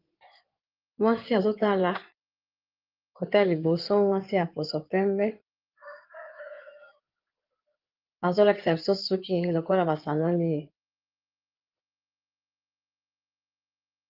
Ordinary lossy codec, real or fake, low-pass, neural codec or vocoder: Opus, 32 kbps; fake; 5.4 kHz; codec, 16 kHz in and 24 kHz out, 2.2 kbps, FireRedTTS-2 codec